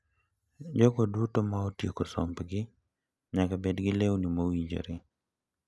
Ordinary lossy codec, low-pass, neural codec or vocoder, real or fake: none; none; none; real